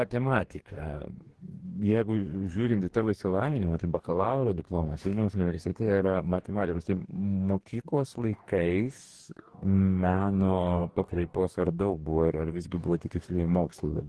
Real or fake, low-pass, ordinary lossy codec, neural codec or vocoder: fake; 10.8 kHz; Opus, 16 kbps; codec, 44.1 kHz, 2.6 kbps, DAC